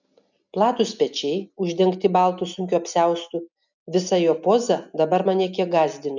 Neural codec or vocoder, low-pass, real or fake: none; 7.2 kHz; real